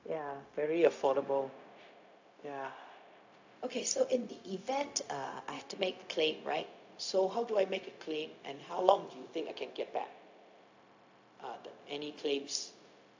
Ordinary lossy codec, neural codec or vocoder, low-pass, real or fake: AAC, 48 kbps; codec, 16 kHz, 0.4 kbps, LongCat-Audio-Codec; 7.2 kHz; fake